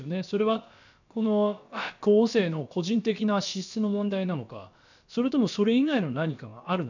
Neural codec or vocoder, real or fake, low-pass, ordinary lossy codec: codec, 16 kHz, about 1 kbps, DyCAST, with the encoder's durations; fake; 7.2 kHz; none